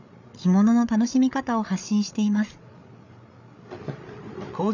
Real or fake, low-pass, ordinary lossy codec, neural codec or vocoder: fake; 7.2 kHz; none; codec, 16 kHz, 8 kbps, FreqCodec, larger model